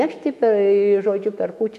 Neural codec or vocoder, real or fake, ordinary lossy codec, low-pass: autoencoder, 48 kHz, 128 numbers a frame, DAC-VAE, trained on Japanese speech; fake; MP3, 64 kbps; 14.4 kHz